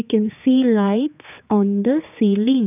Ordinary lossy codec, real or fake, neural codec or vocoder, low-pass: none; fake; codec, 16 kHz, 4 kbps, X-Codec, HuBERT features, trained on general audio; 3.6 kHz